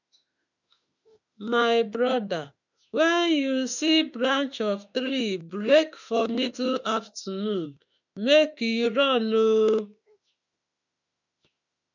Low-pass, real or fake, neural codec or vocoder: 7.2 kHz; fake; autoencoder, 48 kHz, 32 numbers a frame, DAC-VAE, trained on Japanese speech